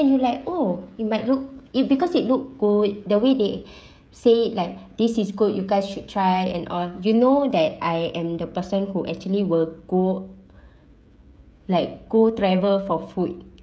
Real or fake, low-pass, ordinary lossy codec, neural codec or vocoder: fake; none; none; codec, 16 kHz, 16 kbps, FreqCodec, smaller model